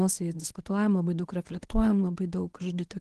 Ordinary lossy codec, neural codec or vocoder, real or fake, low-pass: Opus, 16 kbps; codec, 24 kHz, 0.9 kbps, WavTokenizer, medium speech release version 1; fake; 10.8 kHz